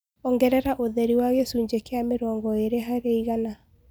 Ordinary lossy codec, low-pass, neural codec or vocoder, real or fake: none; none; none; real